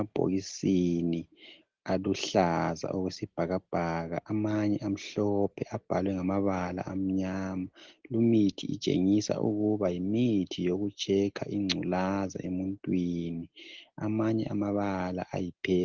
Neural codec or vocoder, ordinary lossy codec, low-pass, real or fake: none; Opus, 16 kbps; 7.2 kHz; real